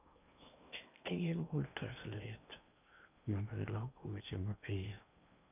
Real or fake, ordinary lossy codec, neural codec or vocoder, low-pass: fake; none; codec, 16 kHz in and 24 kHz out, 0.8 kbps, FocalCodec, streaming, 65536 codes; 3.6 kHz